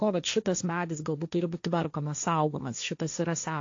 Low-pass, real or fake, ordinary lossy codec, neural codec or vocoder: 7.2 kHz; fake; AAC, 48 kbps; codec, 16 kHz, 1.1 kbps, Voila-Tokenizer